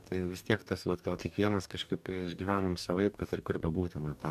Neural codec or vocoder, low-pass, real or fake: codec, 44.1 kHz, 2.6 kbps, DAC; 14.4 kHz; fake